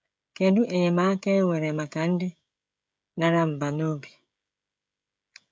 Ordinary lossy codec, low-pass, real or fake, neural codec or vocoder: none; none; fake; codec, 16 kHz, 16 kbps, FreqCodec, smaller model